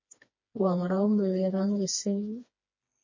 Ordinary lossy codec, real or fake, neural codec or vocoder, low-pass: MP3, 32 kbps; fake; codec, 16 kHz, 2 kbps, FreqCodec, smaller model; 7.2 kHz